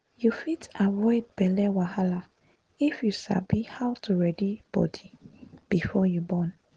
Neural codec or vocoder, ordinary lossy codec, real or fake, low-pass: none; Opus, 16 kbps; real; 9.9 kHz